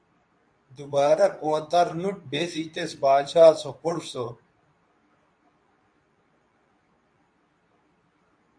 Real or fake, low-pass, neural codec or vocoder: fake; 9.9 kHz; codec, 24 kHz, 0.9 kbps, WavTokenizer, medium speech release version 2